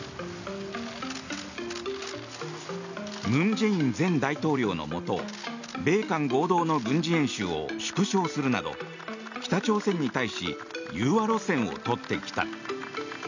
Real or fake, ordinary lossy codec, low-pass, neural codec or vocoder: real; none; 7.2 kHz; none